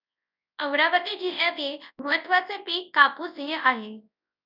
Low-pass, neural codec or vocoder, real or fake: 5.4 kHz; codec, 24 kHz, 0.9 kbps, WavTokenizer, large speech release; fake